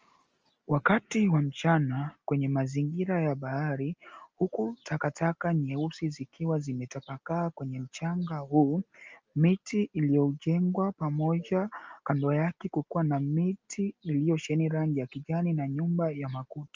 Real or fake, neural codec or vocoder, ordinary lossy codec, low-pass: real; none; Opus, 24 kbps; 7.2 kHz